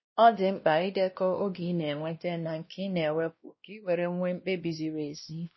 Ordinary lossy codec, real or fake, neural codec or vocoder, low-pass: MP3, 24 kbps; fake; codec, 16 kHz, 1 kbps, X-Codec, WavLM features, trained on Multilingual LibriSpeech; 7.2 kHz